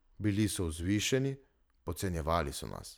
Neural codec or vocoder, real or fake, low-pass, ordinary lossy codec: vocoder, 44.1 kHz, 128 mel bands every 512 samples, BigVGAN v2; fake; none; none